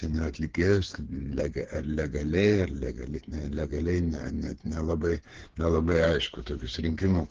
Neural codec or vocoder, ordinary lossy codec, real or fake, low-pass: codec, 16 kHz, 4 kbps, FreqCodec, smaller model; Opus, 16 kbps; fake; 7.2 kHz